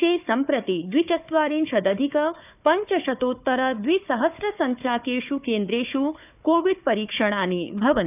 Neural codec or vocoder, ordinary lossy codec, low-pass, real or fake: codec, 16 kHz, 4 kbps, FunCodec, trained on Chinese and English, 50 frames a second; none; 3.6 kHz; fake